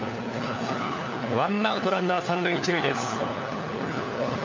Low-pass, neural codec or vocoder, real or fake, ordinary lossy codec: 7.2 kHz; codec, 16 kHz, 4 kbps, FunCodec, trained on LibriTTS, 50 frames a second; fake; MP3, 48 kbps